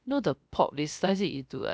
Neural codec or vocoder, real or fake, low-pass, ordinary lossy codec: codec, 16 kHz, 0.3 kbps, FocalCodec; fake; none; none